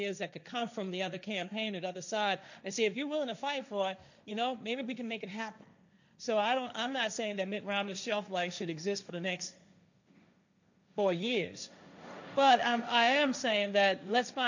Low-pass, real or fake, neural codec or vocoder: 7.2 kHz; fake; codec, 16 kHz, 1.1 kbps, Voila-Tokenizer